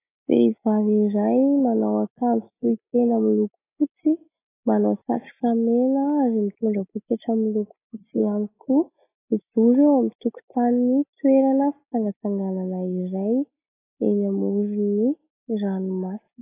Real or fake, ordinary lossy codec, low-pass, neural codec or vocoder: real; AAC, 16 kbps; 3.6 kHz; none